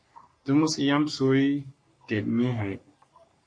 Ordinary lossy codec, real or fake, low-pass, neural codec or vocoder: MP3, 48 kbps; fake; 9.9 kHz; codec, 44.1 kHz, 3.4 kbps, Pupu-Codec